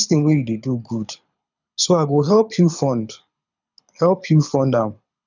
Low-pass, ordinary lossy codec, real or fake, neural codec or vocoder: 7.2 kHz; none; fake; codec, 24 kHz, 6 kbps, HILCodec